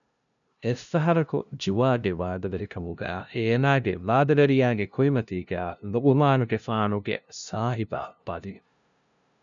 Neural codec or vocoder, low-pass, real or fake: codec, 16 kHz, 0.5 kbps, FunCodec, trained on LibriTTS, 25 frames a second; 7.2 kHz; fake